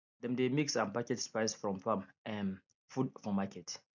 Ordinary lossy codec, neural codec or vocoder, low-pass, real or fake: none; none; 7.2 kHz; real